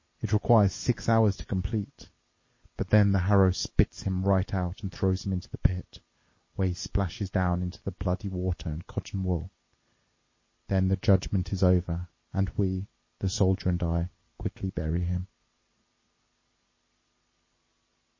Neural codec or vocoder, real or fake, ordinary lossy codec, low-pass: none; real; MP3, 32 kbps; 7.2 kHz